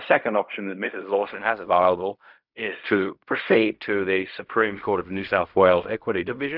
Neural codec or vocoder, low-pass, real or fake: codec, 16 kHz in and 24 kHz out, 0.4 kbps, LongCat-Audio-Codec, fine tuned four codebook decoder; 5.4 kHz; fake